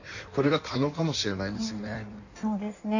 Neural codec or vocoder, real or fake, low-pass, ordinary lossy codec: codec, 16 kHz in and 24 kHz out, 1.1 kbps, FireRedTTS-2 codec; fake; 7.2 kHz; none